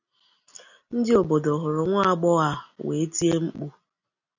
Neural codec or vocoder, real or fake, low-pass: none; real; 7.2 kHz